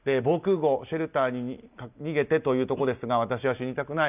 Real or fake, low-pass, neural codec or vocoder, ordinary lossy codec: real; 3.6 kHz; none; none